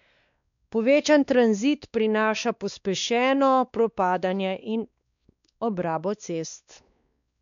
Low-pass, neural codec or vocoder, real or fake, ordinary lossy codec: 7.2 kHz; codec, 16 kHz, 2 kbps, X-Codec, WavLM features, trained on Multilingual LibriSpeech; fake; none